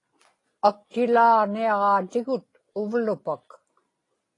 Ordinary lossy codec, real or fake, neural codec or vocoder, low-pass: AAC, 32 kbps; real; none; 10.8 kHz